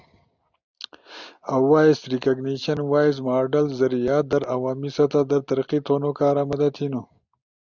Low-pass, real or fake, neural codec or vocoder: 7.2 kHz; real; none